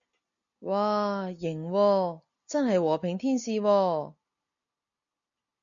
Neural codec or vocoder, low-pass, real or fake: none; 7.2 kHz; real